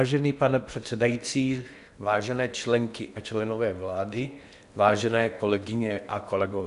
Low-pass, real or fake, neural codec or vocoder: 10.8 kHz; fake; codec, 16 kHz in and 24 kHz out, 0.8 kbps, FocalCodec, streaming, 65536 codes